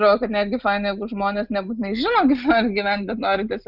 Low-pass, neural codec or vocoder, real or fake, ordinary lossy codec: 5.4 kHz; none; real; Opus, 64 kbps